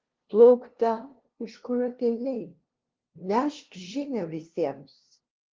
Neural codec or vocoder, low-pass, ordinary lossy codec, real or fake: codec, 16 kHz, 0.5 kbps, FunCodec, trained on LibriTTS, 25 frames a second; 7.2 kHz; Opus, 16 kbps; fake